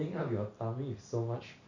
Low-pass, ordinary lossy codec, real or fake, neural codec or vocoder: 7.2 kHz; none; real; none